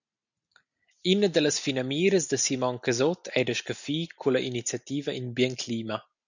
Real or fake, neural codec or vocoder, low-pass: real; none; 7.2 kHz